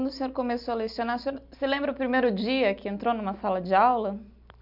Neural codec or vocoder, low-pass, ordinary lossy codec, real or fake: none; 5.4 kHz; none; real